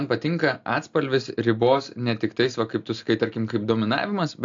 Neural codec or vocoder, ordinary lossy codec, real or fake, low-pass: none; AAC, 64 kbps; real; 7.2 kHz